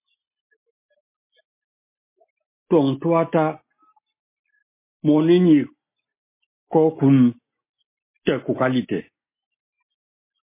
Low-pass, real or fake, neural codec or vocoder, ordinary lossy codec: 3.6 kHz; real; none; MP3, 24 kbps